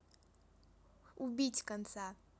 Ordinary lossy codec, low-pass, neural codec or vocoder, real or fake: none; none; none; real